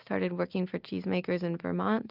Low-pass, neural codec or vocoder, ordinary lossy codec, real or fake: 5.4 kHz; none; Opus, 32 kbps; real